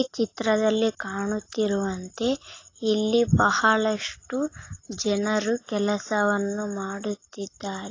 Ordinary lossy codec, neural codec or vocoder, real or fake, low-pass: AAC, 32 kbps; none; real; 7.2 kHz